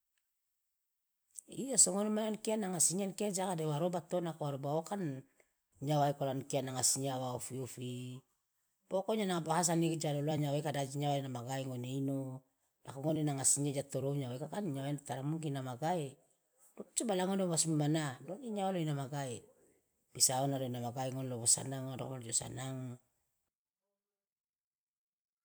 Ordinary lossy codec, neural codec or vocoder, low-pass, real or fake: none; vocoder, 44.1 kHz, 128 mel bands every 256 samples, BigVGAN v2; none; fake